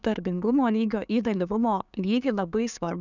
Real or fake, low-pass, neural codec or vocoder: fake; 7.2 kHz; codec, 16 kHz, 6 kbps, DAC